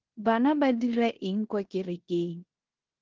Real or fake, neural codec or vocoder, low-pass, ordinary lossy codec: fake; codec, 16 kHz in and 24 kHz out, 0.9 kbps, LongCat-Audio-Codec, four codebook decoder; 7.2 kHz; Opus, 16 kbps